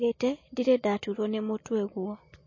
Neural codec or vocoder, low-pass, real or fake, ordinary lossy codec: none; 7.2 kHz; real; MP3, 32 kbps